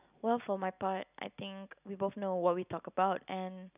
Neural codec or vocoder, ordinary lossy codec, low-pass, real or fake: none; none; 3.6 kHz; real